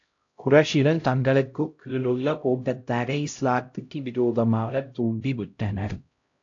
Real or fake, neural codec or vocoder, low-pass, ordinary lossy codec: fake; codec, 16 kHz, 0.5 kbps, X-Codec, HuBERT features, trained on LibriSpeech; 7.2 kHz; AAC, 48 kbps